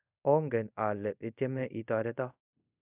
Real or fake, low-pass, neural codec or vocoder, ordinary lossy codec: fake; 3.6 kHz; codec, 24 kHz, 0.5 kbps, DualCodec; none